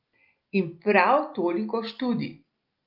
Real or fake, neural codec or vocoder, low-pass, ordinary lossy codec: real; none; 5.4 kHz; Opus, 24 kbps